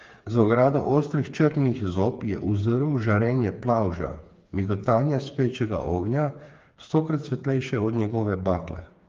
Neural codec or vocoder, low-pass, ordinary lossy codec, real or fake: codec, 16 kHz, 4 kbps, FreqCodec, smaller model; 7.2 kHz; Opus, 32 kbps; fake